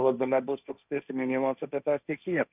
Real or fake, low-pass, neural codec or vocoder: fake; 3.6 kHz; codec, 16 kHz, 1.1 kbps, Voila-Tokenizer